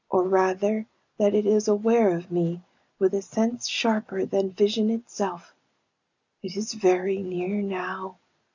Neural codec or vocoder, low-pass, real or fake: none; 7.2 kHz; real